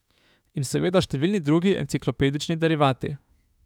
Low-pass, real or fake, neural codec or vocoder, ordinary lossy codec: 19.8 kHz; fake; autoencoder, 48 kHz, 32 numbers a frame, DAC-VAE, trained on Japanese speech; none